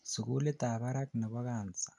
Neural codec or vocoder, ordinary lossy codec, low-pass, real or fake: none; none; 10.8 kHz; real